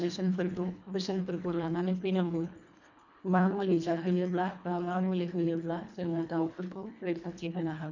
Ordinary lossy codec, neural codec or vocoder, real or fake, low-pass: none; codec, 24 kHz, 1.5 kbps, HILCodec; fake; 7.2 kHz